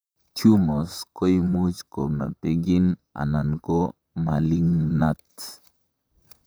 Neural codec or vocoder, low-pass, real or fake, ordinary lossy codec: vocoder, 44.1 kHz, 128 mel bands, Pupu-Vocoder; none; fake; none